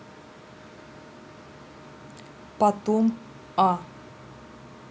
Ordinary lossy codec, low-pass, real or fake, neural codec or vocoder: none; none; real; none